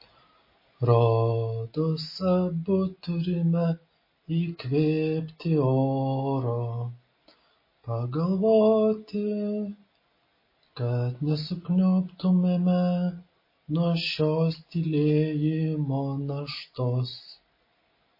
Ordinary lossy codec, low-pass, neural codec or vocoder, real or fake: MP3, 24 kbps; 5.4 kHz; none; real